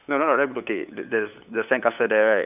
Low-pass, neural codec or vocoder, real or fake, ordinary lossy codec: 3.6 kHz; codec, 16 kHz, 8 kbps, FunCodec, trained on LibriTTS, 25 frames a second; fake; none